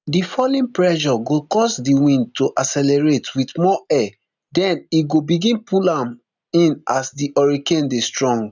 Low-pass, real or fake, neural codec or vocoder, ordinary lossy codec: 7.2 kHz; real; none; none